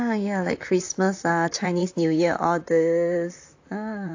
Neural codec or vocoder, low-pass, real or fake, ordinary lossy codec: vocoder, 44.1 kHz, 128 mel bands, Pupu-Vocoder; 7.2 kHz; fake; AAC, 48 kbps